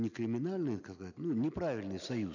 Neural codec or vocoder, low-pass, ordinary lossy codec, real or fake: none; 7.2 kHz; none; real